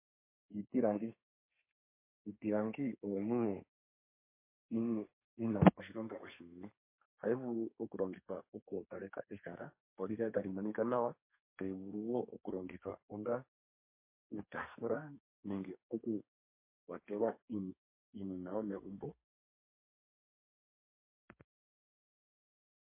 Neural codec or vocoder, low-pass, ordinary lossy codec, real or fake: codec, 32 kHz, 1.9 kbps, SNAC; 3.6 kHz; MP3, 24 kbps; fake